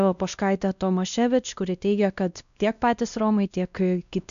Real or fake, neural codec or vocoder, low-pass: fake; codec, 16 kHz, 1 kbps, X-Codec, WavLM features, trained on Multilingual LibriSpeech; 7.2 kHz